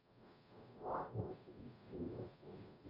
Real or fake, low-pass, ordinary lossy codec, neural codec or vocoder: fake; 5.4 kHz; none; codec, 44.1 kHz, 0.9 kbps, DAC